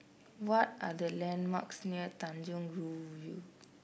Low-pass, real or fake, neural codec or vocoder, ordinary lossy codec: none; real; none; none